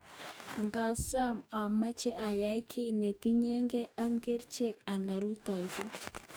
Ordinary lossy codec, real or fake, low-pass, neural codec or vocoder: none; fake; none; codec, 44.1 kHz, 2.6 kbps, DAC